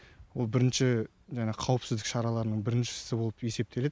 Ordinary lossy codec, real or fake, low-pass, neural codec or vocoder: none; real; none; none